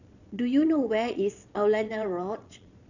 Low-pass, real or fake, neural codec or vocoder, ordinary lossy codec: 7.2 kHz; fake; vocoder, 22.05 kHz, 80 mel bands, Vocos; none